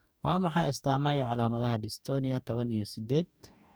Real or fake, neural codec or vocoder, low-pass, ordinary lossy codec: fake; codec, 44.1 kHz, 2.6 kbps, DAC; none; none